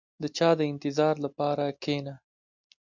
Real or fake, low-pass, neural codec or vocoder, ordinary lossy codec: real; 7.2 kHz; none; MP3, 64 kbps